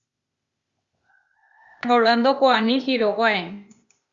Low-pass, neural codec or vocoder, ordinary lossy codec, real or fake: 7.2 kHz; codec, 16 kHz, 0.8 kbps, ZipCodec; Opus, 64 kbps; fake